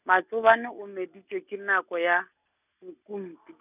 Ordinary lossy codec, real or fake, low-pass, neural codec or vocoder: none; real; 3.6 kHz; none